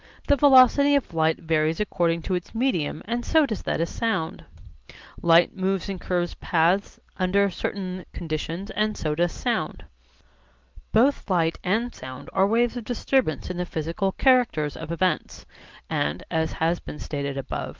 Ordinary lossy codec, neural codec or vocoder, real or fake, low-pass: Opus, 32 kbps; none; real; 7.2 kHz